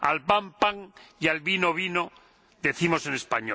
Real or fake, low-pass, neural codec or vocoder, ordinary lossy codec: real; none; none; none